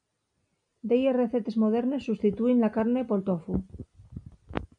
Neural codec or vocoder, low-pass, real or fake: none; 9.9 kHz; real